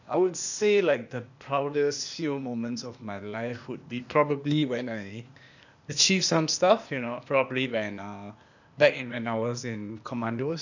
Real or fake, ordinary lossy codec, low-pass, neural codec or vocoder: fake; none; 7.2 kHz; codec, 16 kHz, 0.8 kbps, ZipCodec